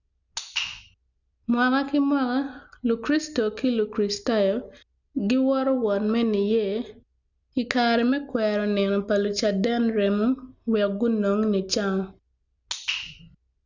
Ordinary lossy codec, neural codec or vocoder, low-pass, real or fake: none; none; 7.2 kHz; real